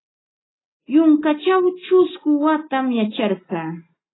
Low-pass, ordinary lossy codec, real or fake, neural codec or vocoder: 7.2 kHz; AAC, 16 kbps; real; none